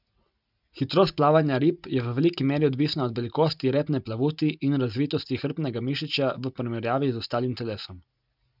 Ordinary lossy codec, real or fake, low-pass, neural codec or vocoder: none; real; 5.4 kHz; none